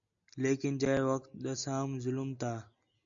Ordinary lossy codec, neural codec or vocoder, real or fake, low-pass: AAC, 64 kbps; none; real; 7.2 kHz